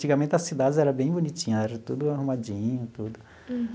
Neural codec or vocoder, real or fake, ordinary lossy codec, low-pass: none; real; none; none